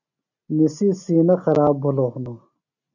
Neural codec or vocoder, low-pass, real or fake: none; 7.2 kHz; real